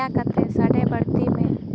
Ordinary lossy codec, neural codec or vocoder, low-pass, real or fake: none; none; none; real